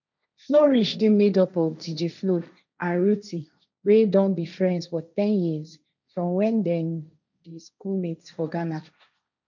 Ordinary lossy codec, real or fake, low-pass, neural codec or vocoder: none; fake; 7.2 kHz; codec, 16 kHz, 1.1 kbps, Voila-Tokenizer